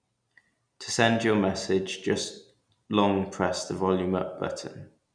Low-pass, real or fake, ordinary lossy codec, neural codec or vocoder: 9.9 kHz; real; none; none